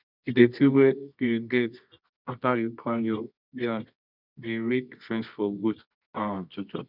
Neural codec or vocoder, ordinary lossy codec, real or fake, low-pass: codec, 24 kHz, 0.9 kbps, WavTokenizer, medium music audio release; none; fake; 5.4 kHz